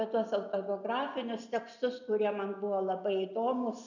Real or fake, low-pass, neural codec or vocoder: real; 7.2 kHz; none